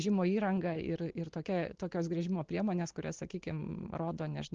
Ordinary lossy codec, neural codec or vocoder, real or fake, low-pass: Opus, 16 kbps; none; real; 7.2 kHz